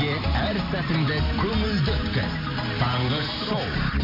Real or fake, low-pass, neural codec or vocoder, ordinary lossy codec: real; 5.4 kHz; none; MP3, 48 kbps